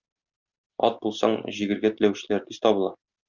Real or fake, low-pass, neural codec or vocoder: real; 7.2 kHz; none